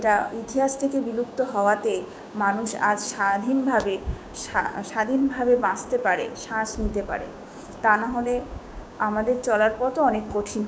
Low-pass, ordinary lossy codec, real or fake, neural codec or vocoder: none; none; fake; codec, 16 kHz, 6 kbps, DAC